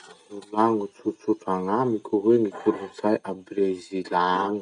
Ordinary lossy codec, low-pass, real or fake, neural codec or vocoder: none; 9.9 kHz; real; none